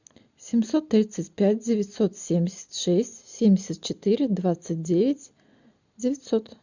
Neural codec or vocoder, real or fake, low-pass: none; real; 7.2 kHz